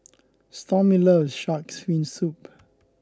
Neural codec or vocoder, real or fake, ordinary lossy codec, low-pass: none; real; none; none